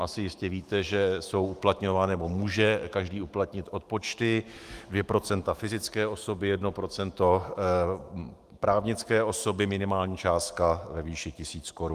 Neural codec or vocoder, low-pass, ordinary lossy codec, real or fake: autoencoder, 48 kHz, 128 numbers a frame, DAC-VAE, trained on Japanese speech; 14.4 kHz; Opus, 24 kbps; fake